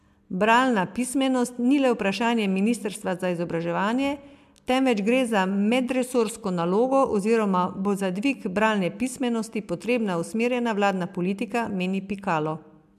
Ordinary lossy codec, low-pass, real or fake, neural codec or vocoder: MP3, 96 kbps; 14.4 kHz; real; none